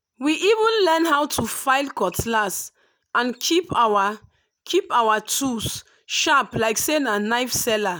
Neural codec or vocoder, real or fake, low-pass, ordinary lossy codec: none; real; none; none